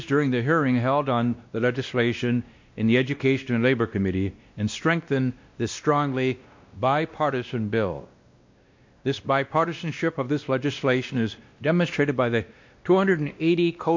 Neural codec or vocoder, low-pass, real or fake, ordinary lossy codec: codec, 16 kHz, 1 kbps, X-Codec, WavLM features, trained on Multilingual LibriSpeech; 7.2 kHz; fake; MP3, 48 kbps